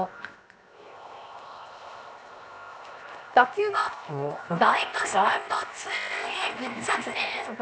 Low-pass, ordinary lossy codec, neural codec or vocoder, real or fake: none; none; codec, 16 kHz, 0.7 kbps, FocalCodec; fake